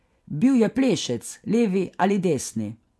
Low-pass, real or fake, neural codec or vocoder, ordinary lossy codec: none; fake; vocoder, 24 kHz, 100 mel bands, Vocos; none